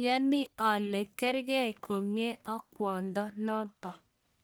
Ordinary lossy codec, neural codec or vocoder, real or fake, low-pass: none; codec, 44.1 kHz, 1.7 kbps, Pupu-Codec; fake; none